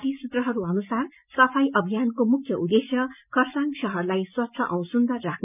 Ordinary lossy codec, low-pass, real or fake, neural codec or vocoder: none; 3.6 kHz; real; none